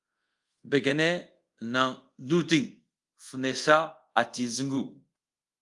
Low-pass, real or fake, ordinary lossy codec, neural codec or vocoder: 10.8 kHz; fake; Opus, 32 kbps; codec, 24 kHz, 0.5 kbps, DualCodec